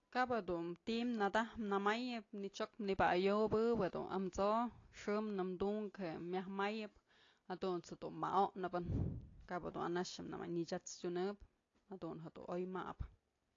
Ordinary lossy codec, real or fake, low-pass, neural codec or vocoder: AAC, 32 kbps; real; 7.2 kHz; none